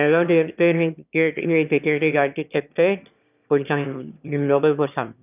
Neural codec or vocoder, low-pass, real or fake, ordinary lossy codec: autoencoder, 22.05 kHz, a latent of 192 numbers a frame, VITS, trained on one speaker; 3.6 kHz; fake; none